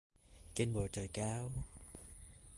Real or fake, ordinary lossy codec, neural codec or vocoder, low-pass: real; Opus, 24 kbps; none; 10.8 kHz